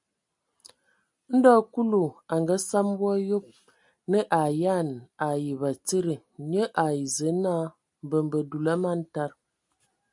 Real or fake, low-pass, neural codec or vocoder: real; 10.8 kHz; none